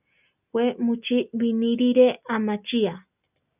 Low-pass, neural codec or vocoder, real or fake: 3.6 kHz; none; real